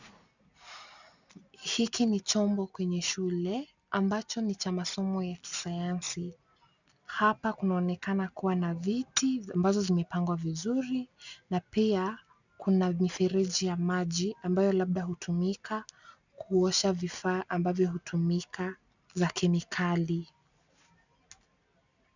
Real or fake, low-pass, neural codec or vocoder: real; 7.2 kHz; none